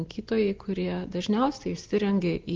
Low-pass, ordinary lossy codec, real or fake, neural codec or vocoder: 7.2 kHz; Opus, 32 kbps; real; none